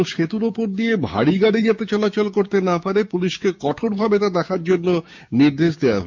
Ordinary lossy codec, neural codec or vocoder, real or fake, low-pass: MP3, 64 kbps; codec, 16 kHz, 6 kbps, DAC; fake; 7.2 kHz